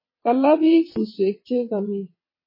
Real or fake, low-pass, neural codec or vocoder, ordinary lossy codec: fake; 5.4 kHz; vocoder, 22.05 kHz, 80 mel bands, WaveNeXt; MP3, 24 kbps